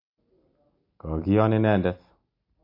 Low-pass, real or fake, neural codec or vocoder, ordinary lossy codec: 5.4 kHz; real; none; MP3, 32 kbps